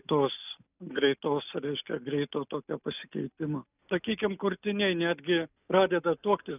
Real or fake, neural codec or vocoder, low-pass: real; none; 3.6 kHz